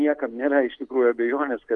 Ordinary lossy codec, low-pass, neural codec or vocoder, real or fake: Opus, 32 kbps; 10.8 kHz; none; real